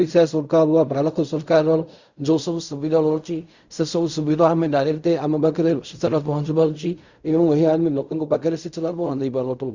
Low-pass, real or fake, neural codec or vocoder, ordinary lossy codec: 7.2 kHz; fake; codec, 16 kHz in and 24 kHz out, 0.4 kbps, LongCat-Audio-Codec, fine tuned four codebook decoder; Opus, 64 kbps